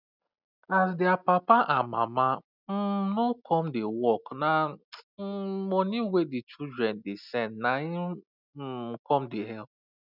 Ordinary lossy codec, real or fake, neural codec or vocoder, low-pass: none; real; none; 5.4 kHz